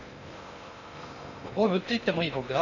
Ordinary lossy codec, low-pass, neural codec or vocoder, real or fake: none; 7.2 kHz; codec, 16 kHz in and 24 kHz out, 0.6 kbps, FocalCodec, streaming, 2048 codes; fake